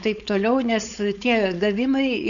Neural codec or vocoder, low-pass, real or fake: codec, 16 kHz, 4.8 kbps, FACodec; 7.2 kHz; fake